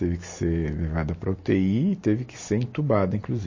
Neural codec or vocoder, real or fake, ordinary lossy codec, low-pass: none; real; MP3, 32 kbps; 7.2 kHz